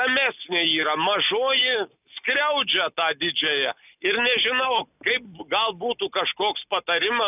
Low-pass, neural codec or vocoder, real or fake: 3.6 kHz; none; real